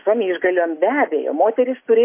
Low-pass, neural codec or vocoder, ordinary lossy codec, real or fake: 3.6 kHz; none; MP3, 24 kbps; real